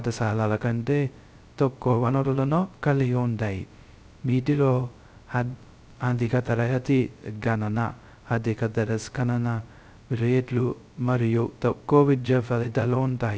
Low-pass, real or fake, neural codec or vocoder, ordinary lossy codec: none; fake; codec, 16 kHz, 0.2 kbps, FocalCodec; none